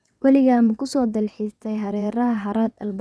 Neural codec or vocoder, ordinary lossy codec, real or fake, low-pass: vocoder, 22.05 kHz, 80 mel bands, WaveNeXt; none; fake; none